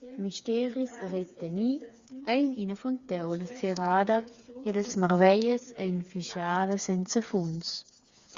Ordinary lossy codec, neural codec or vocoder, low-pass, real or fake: Opus, 64 kbps; codec, 16 kHz, 4 kbps, FreqCodec, smaller model; 7.2 kHz; fake